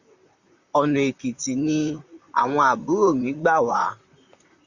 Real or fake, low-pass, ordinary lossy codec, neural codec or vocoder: fake; 7.2 kHz; Opus, 64 kbps; vocoder, 44.1 kHz, 128 mel bands, Pupu-Vocoder